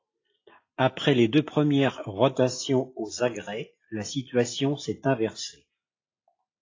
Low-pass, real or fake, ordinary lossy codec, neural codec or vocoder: 7.2 kHz; real; AAC, 32 kbps; none